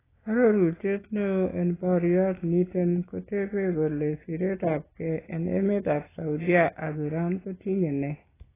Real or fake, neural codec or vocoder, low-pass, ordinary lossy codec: real; none; 3.6 kHz; AAC, 16 kbps